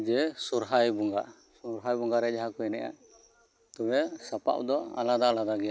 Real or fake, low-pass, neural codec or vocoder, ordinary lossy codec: real; none; none; none